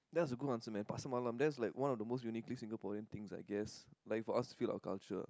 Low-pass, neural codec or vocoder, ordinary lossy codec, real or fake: none; none; none; real